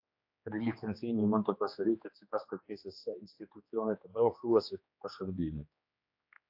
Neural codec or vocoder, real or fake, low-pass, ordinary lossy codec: codec, 16 kHz, 2 kbps, X-Codec, HuBERT features, trained on general audio; fake; 5.4 kHz; AAC, 48 kbps